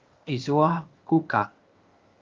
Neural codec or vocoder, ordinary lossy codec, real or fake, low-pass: codec, 16 kHz, 0.8 kbps, ZipCodec; Opus, 24 kbps; fake; 7.2 kHz